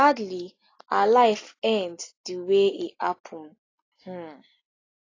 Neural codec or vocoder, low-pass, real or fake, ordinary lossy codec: none; 7.2 kHz; real; AAC, 32 kbps